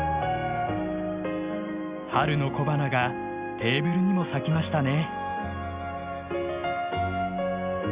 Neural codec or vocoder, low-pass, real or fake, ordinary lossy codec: none; 3.6 kHz; real; Opus, 64 kbps